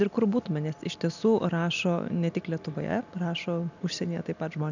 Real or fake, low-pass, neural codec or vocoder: real; 7.2 kHz; none